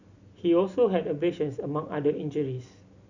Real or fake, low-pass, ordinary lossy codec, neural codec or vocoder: real; 7.2 kHz; none; none